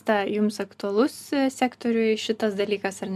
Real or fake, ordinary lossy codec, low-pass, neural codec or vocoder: real; MP3, 96 kbps; 14.4 kHz; none